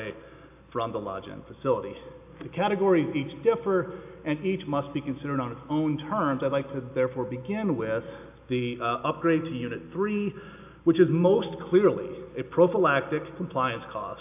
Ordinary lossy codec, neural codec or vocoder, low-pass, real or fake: AAC, 32 kbps; none; 3.6 kHz; real